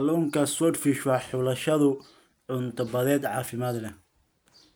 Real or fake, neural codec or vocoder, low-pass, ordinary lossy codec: real; none; none; none